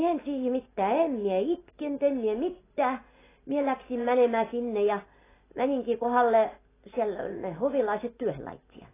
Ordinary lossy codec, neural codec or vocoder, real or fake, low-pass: AAC, 16 kbps; none; real; 3.6 kHz